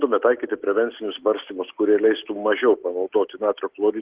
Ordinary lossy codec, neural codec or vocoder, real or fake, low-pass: Opus, 16 kbps; none; real; 3.6 kHz